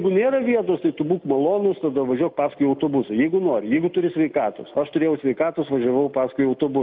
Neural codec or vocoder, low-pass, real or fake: none; 5.4 kHz; real